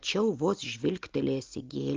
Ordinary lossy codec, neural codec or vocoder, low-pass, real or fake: Opus, 24 kbps; none; 7.2 kHz; real